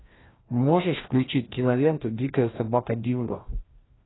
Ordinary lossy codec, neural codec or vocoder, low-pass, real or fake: AAC, 16 kbps; codec, 16 kHz, 1 kbps, FreqCodec, larger model; 7.2 kHz; fake